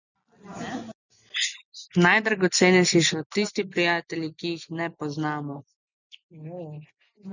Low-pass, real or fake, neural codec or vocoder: 7.2 kHz; real; none